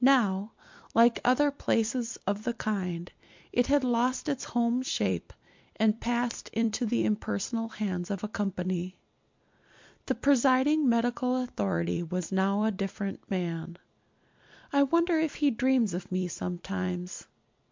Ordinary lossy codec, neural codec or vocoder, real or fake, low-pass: MP3, 64 kbps; vocoder, 44.1 kHz, 128 mel bands every 256 samples, BigVGAN v2; fake; 7.2 kHz